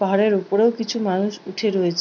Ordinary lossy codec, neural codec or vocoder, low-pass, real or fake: none; none; 7.2 kHz; real